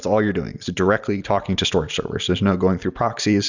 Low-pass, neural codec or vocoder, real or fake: 7.2 kHz; none; real